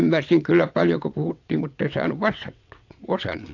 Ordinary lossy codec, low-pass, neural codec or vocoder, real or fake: AAC, 48 kbps; 7.2 kHz; vocoder, 22.05 kHz, 80 mel bands, WaveNeXt; fake